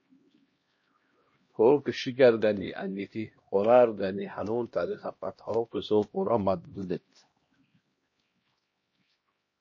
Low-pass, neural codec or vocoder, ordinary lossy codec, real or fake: 7.2 kHz; codec, 16 kHz, 1 kbps, X-Codec, HuBERT features, trained on LibriSpeech; MP3, 32 kbps; fake